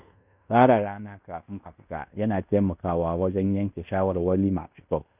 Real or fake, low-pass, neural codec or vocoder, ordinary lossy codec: fake; 3.6 kHz; codec, 16 kHz in and 24 kHz out, 0.9 kbps, LongCat-Audio-Codec, fine tuned four codebook decoder; none